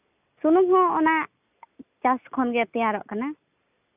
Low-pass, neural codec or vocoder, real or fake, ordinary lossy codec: 3.6 kHz; none; real; none